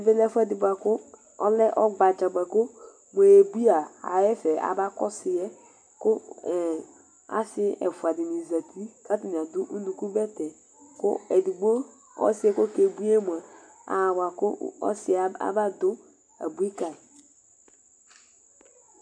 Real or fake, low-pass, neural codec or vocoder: real; 9.9 kHz; none